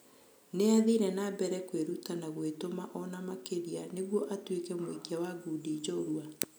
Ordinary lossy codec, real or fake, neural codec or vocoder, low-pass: none; real; none; none